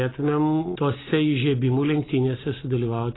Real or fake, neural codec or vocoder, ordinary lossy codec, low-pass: real; none; AAC, 16 kbps; 7.2 kHz